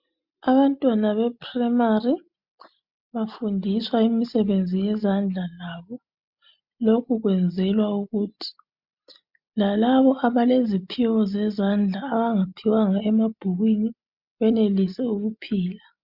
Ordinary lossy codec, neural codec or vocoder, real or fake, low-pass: MP3, 48 kbps; none; real; 5.4 kHz